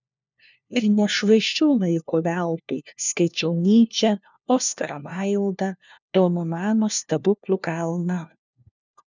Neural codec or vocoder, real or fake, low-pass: codec, 16 kHz, 1 kbps, FunCodec, trained on LibriTTS, 50 frames a second; fake; 7.2 kHz